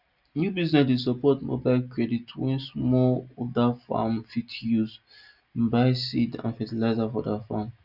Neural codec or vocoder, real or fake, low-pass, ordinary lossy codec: none; real; 5.4 kHz; none